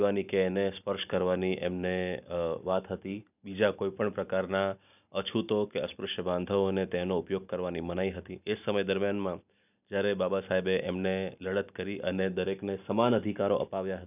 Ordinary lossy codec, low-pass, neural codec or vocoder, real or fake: none; 3.6 kHz; none; real